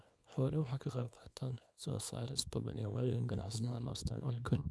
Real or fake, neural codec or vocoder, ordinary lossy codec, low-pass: fake; codec, 24 kHz, 0.9 kbps, WavTokenizer, small release; none; none